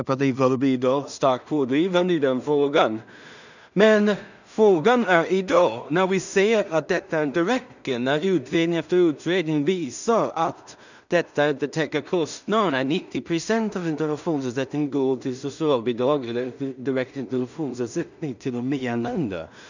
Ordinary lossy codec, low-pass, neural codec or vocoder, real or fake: none; 7.2 kHz; codec, 16 kHz in and 24 kHz out, 0.4 kbps, LongCat-Audio-Codec, two codebook decoder; fake